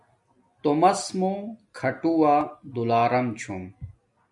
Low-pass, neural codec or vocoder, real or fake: 10.8 kHz; none; real